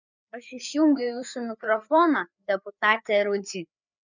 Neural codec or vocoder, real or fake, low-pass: codec, 16 kHz, 4 kbps, FreqCodec, larger model; fake; 7.2 kHz